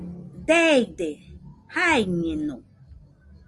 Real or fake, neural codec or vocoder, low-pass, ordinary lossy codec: real; none; 10.8 kHz; Opus, 32 kbps